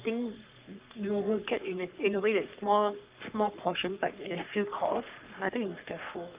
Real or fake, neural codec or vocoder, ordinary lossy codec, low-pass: fake; codec, 44.1 kHz, 3.4 kbps, Pupu-Codec; Opus, 32 kbps; 3.6 kHz